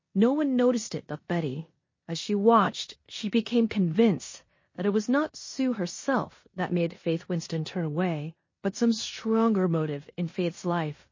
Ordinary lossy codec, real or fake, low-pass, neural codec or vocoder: MP3, 32 kbps; fake; 7.2 kHz; codec, 16 kHz in and 24 kHz out, 0.9 kbps, LongCat-Audio-Codec, four codebook decoder